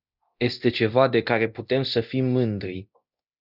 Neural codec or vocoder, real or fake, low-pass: codec, 24 kHz, 0.9 kbps, DualCodec; fake; 5.4 kHz